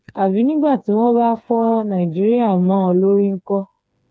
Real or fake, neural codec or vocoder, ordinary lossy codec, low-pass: fake; codec, 16 kHz, 4 kbps, FreqCodec, smaller model; none; none